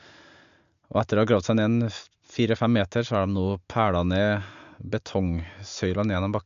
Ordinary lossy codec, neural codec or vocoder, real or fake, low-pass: MP3, 64 kbps; none; real; 7.2 kHz